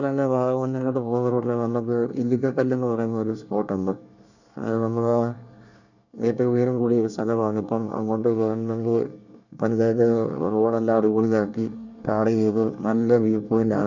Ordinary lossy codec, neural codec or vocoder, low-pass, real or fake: none; codec, 24 kHz, 1 kbps, SNAC; 7.2 kHz; fake